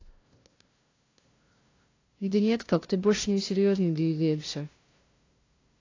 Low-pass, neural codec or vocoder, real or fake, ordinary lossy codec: 7.2 kHz; codec, 16 kHz, 0.5 kbps, FunCodec, trained on LibriTTS, 25 frames a second; fake; AAC, 32 kbps